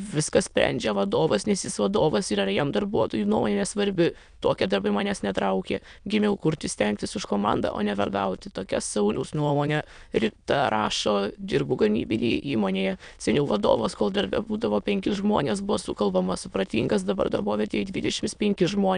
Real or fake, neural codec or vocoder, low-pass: fake; autoencoder, 22.05 kHz, a latent of 192 numbers a frame, VITS, trained on many speakers; 9.9 kHz